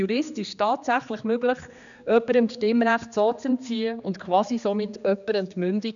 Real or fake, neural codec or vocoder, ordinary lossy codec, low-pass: fake; codec, 16 kHz, 2 kbps, X-Codec, HuBERT features, trained on general audio; none; 7.2 kHz